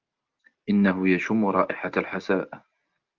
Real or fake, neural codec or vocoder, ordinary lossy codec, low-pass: real; none; Opus, 24 kbps; 7.2 kHz